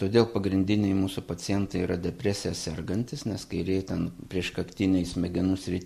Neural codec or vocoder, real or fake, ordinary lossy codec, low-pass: none; real; MP3, 64 kbps; 14.4 kHz